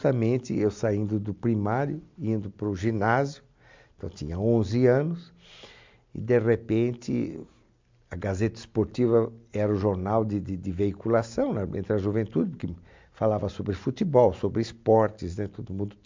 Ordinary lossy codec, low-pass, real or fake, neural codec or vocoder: MP3, 64 kbps; 7.2 kHz; real; none